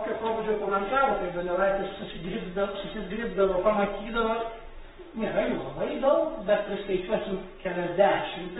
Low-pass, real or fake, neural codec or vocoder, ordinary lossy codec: 19.8 kHz; fake; codec, 44.1 kHz, 7.8 kbps, Pupu-Codec; AAC, 16 kbps